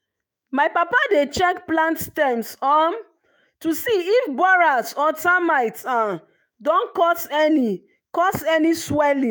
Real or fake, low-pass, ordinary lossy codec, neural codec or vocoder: fake; none; none; autoencoder, 48 kHz, 128 numbers a frame, DAC-VAE, trained on Japanese speech